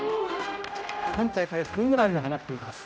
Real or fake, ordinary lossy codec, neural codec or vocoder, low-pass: fake; none; codec, 16 kHz, 0.5 kbps, X-Codec, HuBERT features, trained on general audio; none